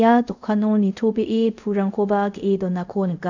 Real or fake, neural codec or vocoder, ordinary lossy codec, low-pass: fake; codec, 24 kHz, 0.5 kbps, DualCodec; none; 7.2 kHz